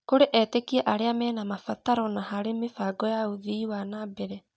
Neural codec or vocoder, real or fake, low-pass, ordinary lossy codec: none; real; none; none